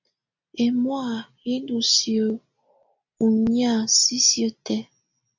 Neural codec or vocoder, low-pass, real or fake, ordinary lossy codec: none; 7.2 kHz; real; MP3, 64 kbps